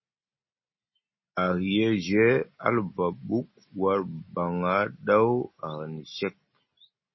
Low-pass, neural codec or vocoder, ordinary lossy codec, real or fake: 7.2 kHz; none; MP3, 24 kbps; real